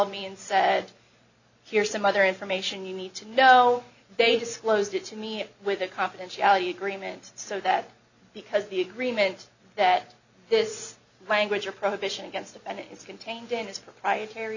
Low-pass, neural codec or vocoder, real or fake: 7.2 kHz; none; real